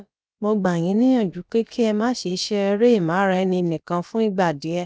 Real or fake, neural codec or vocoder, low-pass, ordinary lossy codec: fake; codec, 16 kHz, about 1 kbps, DyCAST, with the encoder's durations; none; none